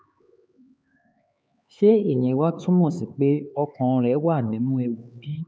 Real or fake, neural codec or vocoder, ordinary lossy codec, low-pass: fake; codec, 16 kHz, 4 kbps, X-Codec, HuBERT features, trained on LibriSpeech; none; none